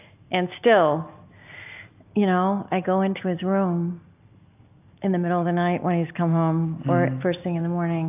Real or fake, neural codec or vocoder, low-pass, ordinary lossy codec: real; none; 3.6 kHz; AAC, 32 kbps